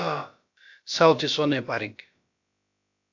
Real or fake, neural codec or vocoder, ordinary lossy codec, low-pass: fake; codec, 16 kHz, about 1 kbps, DyCAST, with the encoder's durations; AAC, 48 kbps; 7.2 kHz